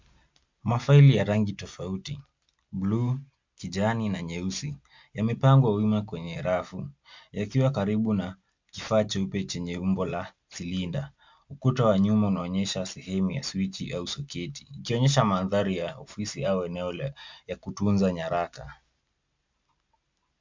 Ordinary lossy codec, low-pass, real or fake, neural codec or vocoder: MP3, 64 kbps; 7.2 kHz; real; none